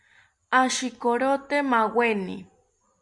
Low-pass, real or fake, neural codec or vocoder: 10.8 kHz; real; none